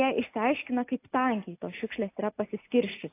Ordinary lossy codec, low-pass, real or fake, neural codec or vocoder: AAC, 24 kbps; 3.6 kHz; real; none